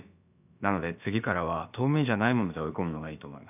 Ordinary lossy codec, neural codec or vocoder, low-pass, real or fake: none; codec, 16 kHz, about 1 kbps, DyCAST, with the encoder's durations; 3.6 kHz; fake